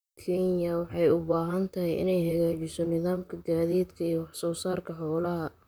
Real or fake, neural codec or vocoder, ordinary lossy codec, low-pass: fake; vocoder, 44.1 kHz, 128 mel bands, Pupu-Vocoder; none; none